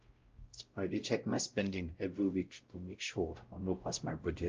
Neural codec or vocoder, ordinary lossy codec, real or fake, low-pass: codec, 16 kHz, 0.5 kbps, X-Codec, WavLM features, trained on Multilingual LibriSpeech; Opus, 24 kbps; fake; 7.2 kHz